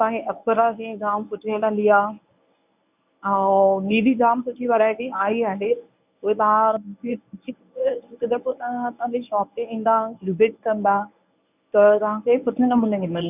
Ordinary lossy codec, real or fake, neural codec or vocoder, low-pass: none; fake; codec, 24 kHz, 0.9 kbps, WavTokenizer, medium speech release version 1; 3.6 kHz